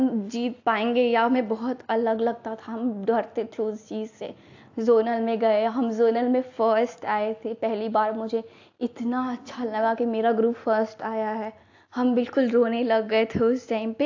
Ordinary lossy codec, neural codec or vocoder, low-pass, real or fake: AAC, 48 kbps; none; 7.2 kHz; real